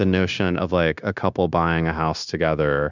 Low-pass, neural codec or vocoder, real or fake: 7.2 kHz; codec, 16 kHz, 0.9 kbps, LongCat-Audio-Codec; fake